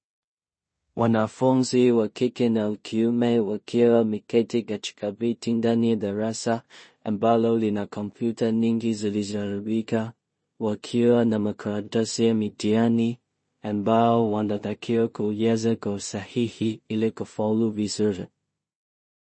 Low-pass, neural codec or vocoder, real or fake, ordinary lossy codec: 9.9 kHz; codec, 16 kHz in and 24 kHz out, 0.4 kbps, LongCat-Audio-Codec, two codebook decoder; fake; MP3, 32 kbps